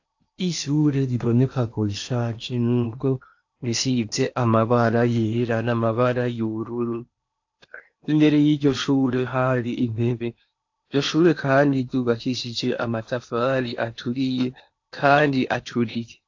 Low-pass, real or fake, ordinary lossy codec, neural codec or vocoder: 7.2 kHz; fake; AAC, 48 kbps; codec, 16 kHz in and 24 kHz out, 0.8 kbps, FocalCodec, streaming, 65536 codes